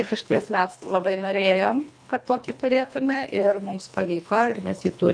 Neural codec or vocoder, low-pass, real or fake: codec, 24 kHz, 1.5 kbps, HILCodec; 9.9 kHz; fake